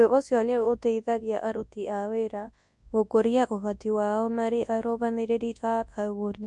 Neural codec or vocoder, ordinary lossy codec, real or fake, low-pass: codec, 24 kHz, 0.9 kbps, WavTokenizer, large speech release; MP3, 64 kbps; fake; 10.8 kHz